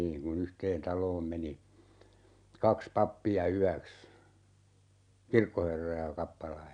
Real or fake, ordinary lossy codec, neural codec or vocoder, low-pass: real; none; none; 9.9 kHz